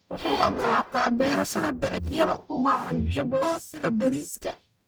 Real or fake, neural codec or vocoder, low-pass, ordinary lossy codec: fake; codec, 44.1 kHz, 0.9 kbps, DAC; none; none